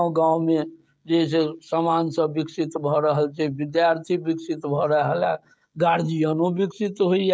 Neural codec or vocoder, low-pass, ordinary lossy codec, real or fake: codec, 16 kHz, 16 kbps, FreqCodec, smaller model; none; none; fake